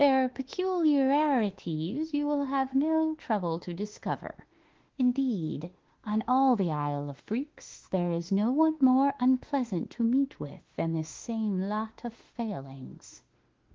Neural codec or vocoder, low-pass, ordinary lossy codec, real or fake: autoencoder, 48 kHz, 32 numbers a frame, DAC-VAE, trained on Japanese speech; 7.2 kHz; Opus, 16 kbps; fake